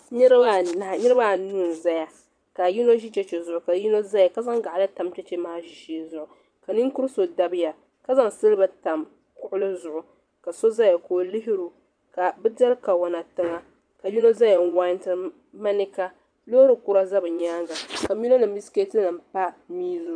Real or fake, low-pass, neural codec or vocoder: fake; 9.9 kHz; vocoder, 22.05 kHz, 80 mel bands, Vocos